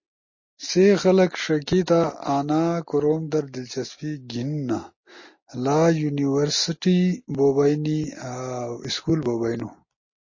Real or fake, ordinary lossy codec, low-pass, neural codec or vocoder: real; MP3, 32 kbps; 7.2 kHz; none